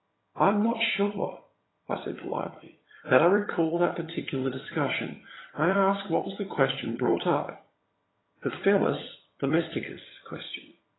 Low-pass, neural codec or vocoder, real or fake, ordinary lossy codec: 7.2 kHz; vocoder, 22.05 kHz, 80 mel bands, HiFi-GAN; fake; AAC, 16 kbps